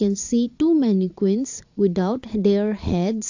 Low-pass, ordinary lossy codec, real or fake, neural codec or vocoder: 7.2 kHz; AAC, 48 kbps; fake; vocoder, 22.05 kHz, 80 mel bands, Vocos